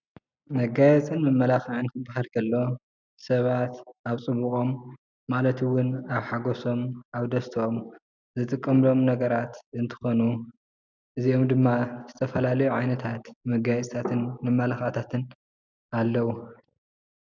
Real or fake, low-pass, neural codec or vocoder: real; 7.2 kHz; none